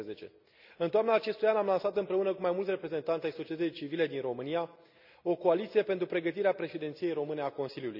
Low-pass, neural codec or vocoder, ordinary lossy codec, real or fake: 5.4 kHz; none; none; real